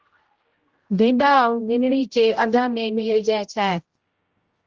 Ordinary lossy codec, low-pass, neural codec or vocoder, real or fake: Opus, 16 kbps; 7.2 kHz; codec, 16 kHz, 0.5 kbps, X-Codec, HuBERT features, trained on general audio; fake